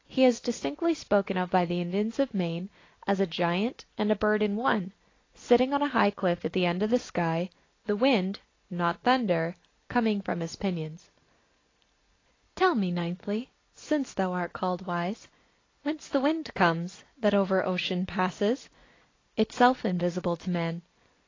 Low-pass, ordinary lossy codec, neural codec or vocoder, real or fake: 7.2 kHz; AAC, 32 kbps; none; real